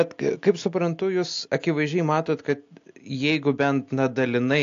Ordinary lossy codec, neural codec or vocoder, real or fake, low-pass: AAC, 48 kbps; none; real; 7.2 kHz